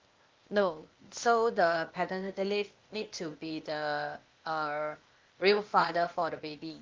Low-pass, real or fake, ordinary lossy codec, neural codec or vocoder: 7.2 kHz; fake; Opus, 24 kbps; codec, 16 kHz, 0.8 kbps, ZipCodec